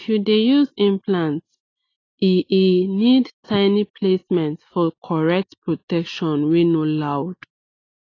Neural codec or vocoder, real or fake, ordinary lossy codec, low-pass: none; real; AAC, 32 kbps; 7.2 kHz